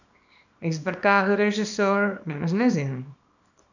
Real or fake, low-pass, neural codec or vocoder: fake; 7.2 kHz; codec, 24 kHz, 0.9 kbps, WavTokenizer, small release